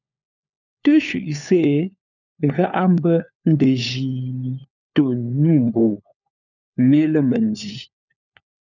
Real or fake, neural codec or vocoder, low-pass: fake; codec, 16 kHz, 4 kbps, FunCodec, trained on LibriTTS, 50 frames a second; 7.2 kHz